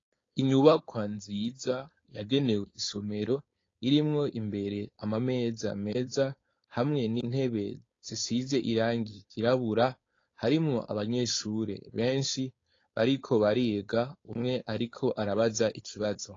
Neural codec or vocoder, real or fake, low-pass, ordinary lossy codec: codec, 16 kHz, 4.8 kbps, FACodec; fake; 7.2 kHz; AAC, 32 kbps